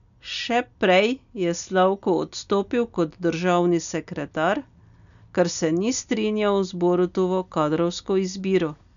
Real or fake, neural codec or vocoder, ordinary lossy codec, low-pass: real; none; none; 7.2 kHz